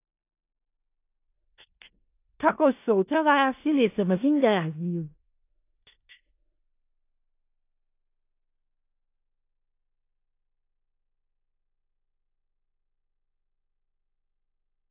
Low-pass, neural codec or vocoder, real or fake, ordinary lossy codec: 3.6 kHz; codec, 16 kHz in and 24 kHz out, 0.4 kbps, LongCat-Audio-Codec, four codebook decoder; fake; AAC, 24 kbps